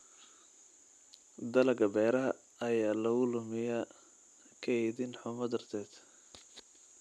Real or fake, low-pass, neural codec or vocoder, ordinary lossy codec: real; none; none; none